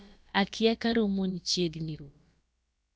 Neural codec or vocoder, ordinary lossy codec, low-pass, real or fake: codec, 16 kHz, about 1 kbps, DyCAST, with the encoder's durations; none; none; fake